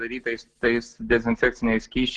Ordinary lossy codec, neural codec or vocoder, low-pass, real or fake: Opus, 16 kbps; none; 10.8 kHz; real